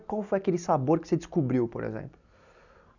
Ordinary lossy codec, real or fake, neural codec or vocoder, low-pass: none; real; none; 7.2 kHz